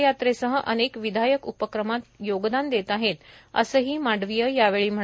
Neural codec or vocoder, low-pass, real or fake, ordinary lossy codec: none; none; real; none